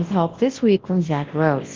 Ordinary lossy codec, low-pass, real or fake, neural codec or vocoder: Opus, 16 kbps; 7.2 kHz; fake; codec, 24 kHz, 0.9 kbps, WavTokenizer, large speech release